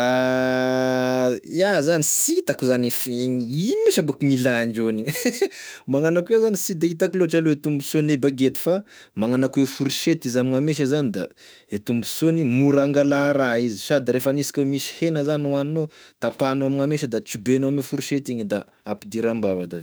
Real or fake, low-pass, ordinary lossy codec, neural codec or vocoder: fake; none; none; autoencoder, 48 kHz, 32 numbers a frame, DAC-VAE, trained on Japanese speech